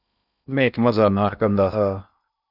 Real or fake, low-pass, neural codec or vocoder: fake; 5.4 kHz; codec, 16 kHz in and 24 kHz out, 0.6 kbps, FocalCodec, streaming, 2048 codes